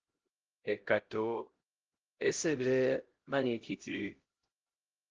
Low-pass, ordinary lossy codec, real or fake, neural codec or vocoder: 7.2 kHz; Opus, 16 kbps; fake; codec, 16 kHz, 0.5 kbps, X-Codec, HuBERT features, trained on LibriSpeech